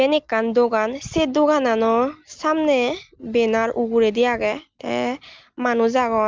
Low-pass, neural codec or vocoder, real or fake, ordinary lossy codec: 7.2 kHz; none; real; Opus, 32 kbps